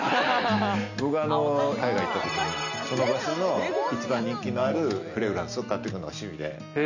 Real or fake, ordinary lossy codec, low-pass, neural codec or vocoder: real; none; 7.2 kHz; none